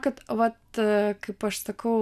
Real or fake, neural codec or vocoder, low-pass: real; none; 14.4 kHz